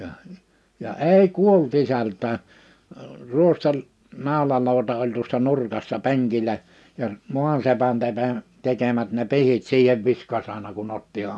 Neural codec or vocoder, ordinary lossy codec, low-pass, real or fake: none; none; none; real